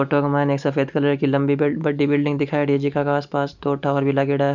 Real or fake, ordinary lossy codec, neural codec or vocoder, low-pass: real; none; none; 7.2 kHz